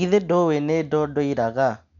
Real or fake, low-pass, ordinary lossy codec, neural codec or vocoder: real; 7.2 kHz; none; none